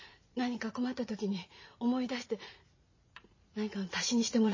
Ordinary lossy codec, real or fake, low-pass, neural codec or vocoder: MP3, 32 kbps; fake; 7.2 kHz; vocoder, 44.1 kHz, 80 mel bands, Vocos